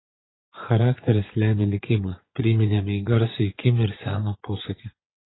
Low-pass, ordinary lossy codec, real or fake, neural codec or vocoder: 7.2 kHz; AAC, 16 kbps; fake; vocoder, 24 kHz, 100 mel bands, Vocos